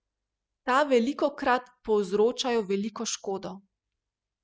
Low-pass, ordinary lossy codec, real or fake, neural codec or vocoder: none; none; real; none